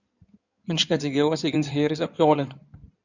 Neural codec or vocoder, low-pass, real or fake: codec, 16 kHz in and 24 kHz out, 2.2 kbps, FireRedTTS-2 codec; 7.2 kHz; fake